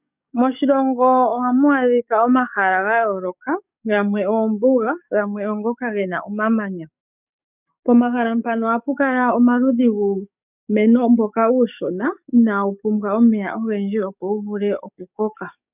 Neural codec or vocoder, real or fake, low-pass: codec, 44.1 kHz, 7.8 kbps, DAC; fake; 3.6 kHz